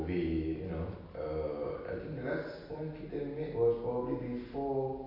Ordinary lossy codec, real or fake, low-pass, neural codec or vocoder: none; real; 5.4 kHz; none